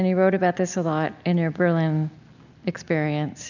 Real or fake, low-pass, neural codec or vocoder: real; 7.2 kHz; none